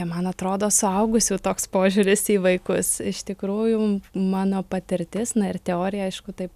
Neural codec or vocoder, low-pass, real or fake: none; 14.4 kHz; real